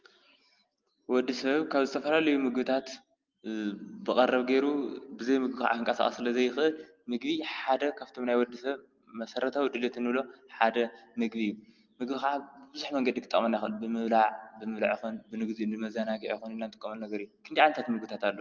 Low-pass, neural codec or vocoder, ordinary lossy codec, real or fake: 7.2 kHz; none; Opus, 32 kbps; real